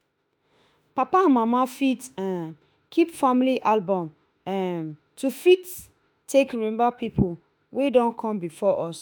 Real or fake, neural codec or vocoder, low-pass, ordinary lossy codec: fake; autoencoder, 48 kHz, 32 numbers a frame, DAC-VAE, trained on Japanese speech; none; none